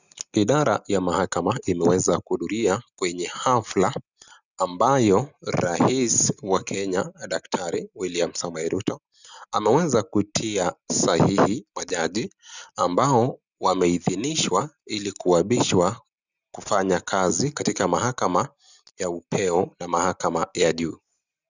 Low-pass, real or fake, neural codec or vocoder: 7.2 kHz; real; none